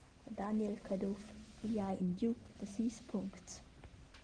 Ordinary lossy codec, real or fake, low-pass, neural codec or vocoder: Opus, 16 kbps; real; 9.9 kHz; none